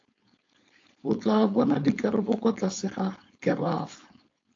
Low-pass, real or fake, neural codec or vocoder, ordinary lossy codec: 7.2 kHz; fake; codec, 16 kHz, 4.8 kbps, FACodec; AAC, 48 kbps